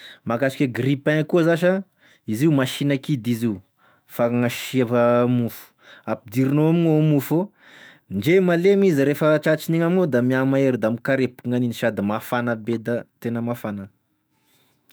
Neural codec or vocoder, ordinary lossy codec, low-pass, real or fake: autoencoder, 48 kHz, 128 numbers a frame, DAC-VAE, trained on Japanese speech; none; none; fake